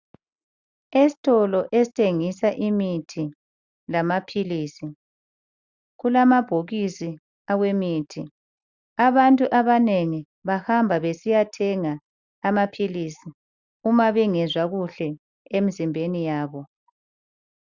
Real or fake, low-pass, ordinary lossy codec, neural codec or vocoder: real; 7.2 kHz; Opus, 64 kbps; none